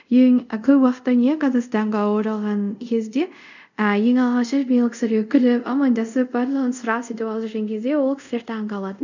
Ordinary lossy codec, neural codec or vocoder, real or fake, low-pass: none; codec, 24 kHz, 0.5 kbps, DualCodec; fake; 7.2 kHz